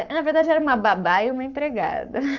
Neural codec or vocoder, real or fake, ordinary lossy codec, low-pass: codec, 44.1 kHz, 7.8 kbps, Pupu-Codec; fake; none; 7.2 kHz